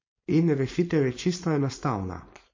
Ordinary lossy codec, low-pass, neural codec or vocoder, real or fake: MP3, 32 kbps; 7.2 kHz; codec, 16 kHz, 4.8 kbps, FACodec; fake